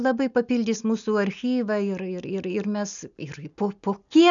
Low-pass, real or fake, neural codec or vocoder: 7.2 kHz; real; none